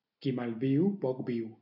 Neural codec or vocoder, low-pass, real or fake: none; 5.4 kHz; real